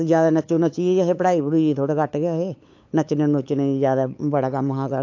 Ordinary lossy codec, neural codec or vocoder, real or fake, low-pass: AAC, 48 kbps; codec, 16 kHz, 8 kbps, FunCodec, trained on LibriTTS, 25 frames a second; fake; 7.2 kHz